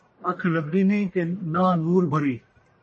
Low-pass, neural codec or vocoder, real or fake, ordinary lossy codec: 10.8 kHz; codec, 44.1 kHz, 1.7 kbps, Pupu-Codec; fake; MP3, 32 kbps